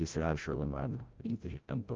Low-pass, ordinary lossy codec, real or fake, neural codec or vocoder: 7.2 kHz; Opus, 24 kbps; fake; codec, 16 kHz, 0.5 kbps, FreqCodec, larger model